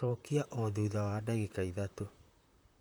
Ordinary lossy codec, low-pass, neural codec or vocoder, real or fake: none; none; vocoder, 44.1 kHz, 128 mel bands, Pupu-Vocoder; fake